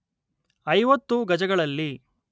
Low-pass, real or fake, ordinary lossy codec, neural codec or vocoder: none; real; none; none